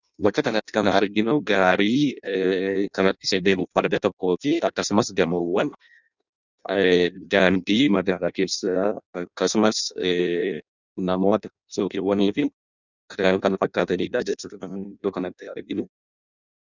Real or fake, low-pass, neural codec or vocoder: fake; 7.2 kHz; codec, 16 kHz in and 24 kHz out, 0.6 kbps, FireRedTTS-2 codec